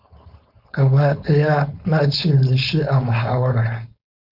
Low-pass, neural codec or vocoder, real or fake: 5.4 kHz; codec, 16 kHz, 4.8 kbps, FACodec; fake